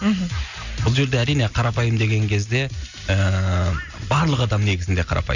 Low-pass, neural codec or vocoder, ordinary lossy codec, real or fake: 7.2 kHz; none; none; real